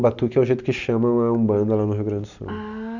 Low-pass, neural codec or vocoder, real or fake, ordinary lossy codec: 7.2 kHz; none; real; none